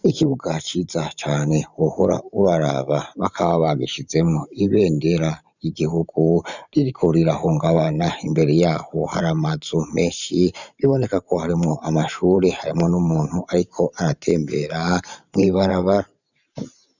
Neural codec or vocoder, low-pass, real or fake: vocoder, 44.1 kHz, 128 mel bands every 512 samples, BigVGAN v2; 7.2 kHz; fake